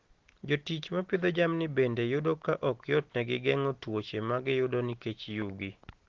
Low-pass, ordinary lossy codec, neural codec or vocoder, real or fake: 7.2 kHz; Opus, 16 kbps; none; real